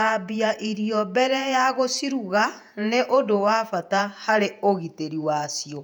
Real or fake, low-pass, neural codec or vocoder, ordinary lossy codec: fake; 19.8 kHz; vocoder, 48 kHz, 128 mel bands, Vocos; none